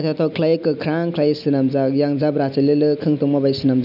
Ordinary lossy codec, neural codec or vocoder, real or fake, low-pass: none; none; real; 5.4 kHz